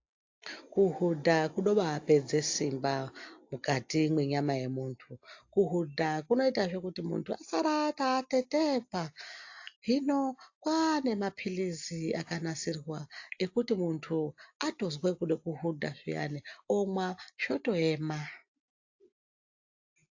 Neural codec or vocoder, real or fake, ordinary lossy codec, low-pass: none; real; AAC, 48 kbps; 7.2 kHz